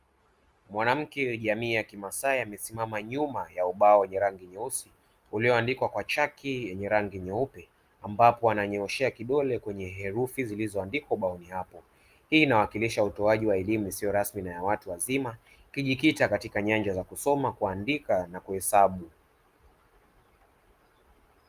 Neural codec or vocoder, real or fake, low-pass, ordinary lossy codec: none; real; 14.4 kHz; Opus, 32 kbps